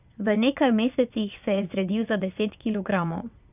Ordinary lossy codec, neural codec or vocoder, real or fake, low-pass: none; vocoder, 22.05 kHz, 80 mel bands, WaveNeXt; fake; 3.6 kHz